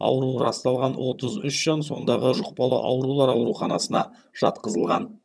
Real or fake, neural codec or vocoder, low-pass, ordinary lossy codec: fake; vocoder, 22.05 kHz, 80 mel bands, HiFi-GAN; none; none